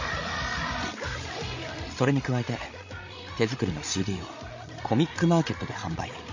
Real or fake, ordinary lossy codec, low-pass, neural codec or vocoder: fake; MP3, 32 kbps; 7.2 kHz; codec, 16 kHz, 16 kbps, FreqCodec, larger model